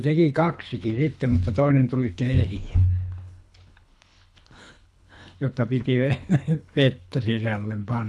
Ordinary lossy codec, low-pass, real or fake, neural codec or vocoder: none; none; fake; codec, 24 kHz, 3 kbps, HILCodec